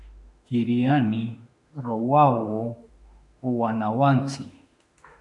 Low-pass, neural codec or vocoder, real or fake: 10.8 kHz; autoencoder, 48 kHz, 32 numbers a frame, DAC-VAE, trained on Japanese speech; fake